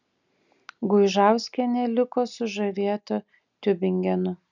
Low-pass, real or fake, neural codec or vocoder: 7.2 kHz; real; none